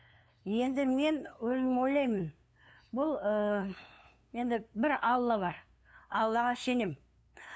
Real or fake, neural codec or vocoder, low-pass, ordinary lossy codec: fake; codec, 16 kHz, 2 kbps, FunCodec, trained on LibriTTS, 25 frames a second; none; none